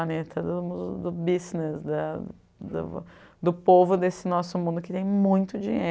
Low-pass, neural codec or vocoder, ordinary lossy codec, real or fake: none; none; none; real